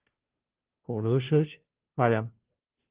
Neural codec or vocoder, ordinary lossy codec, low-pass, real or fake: codec, 16 kHz, 0.5 kbps, FunCodec, trained on Chinese and English, 25 frames a second; Opus, 16 kbps; 3.6 kHz; fake